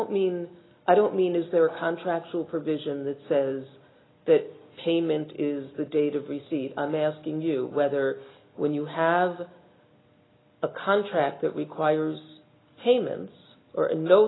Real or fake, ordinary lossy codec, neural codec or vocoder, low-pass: real; AAC, 16 kbps; none; 7.2 kHz